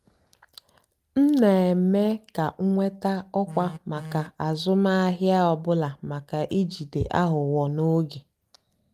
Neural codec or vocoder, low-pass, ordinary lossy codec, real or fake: none; 14.4 kHz; Opus, 32 kbps; real